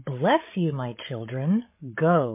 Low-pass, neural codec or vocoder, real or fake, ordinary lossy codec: 3.6 kHz; codec, 16 kHz, 16 kbps, FreqCodec, larger model; fake; MP3, 24 kbps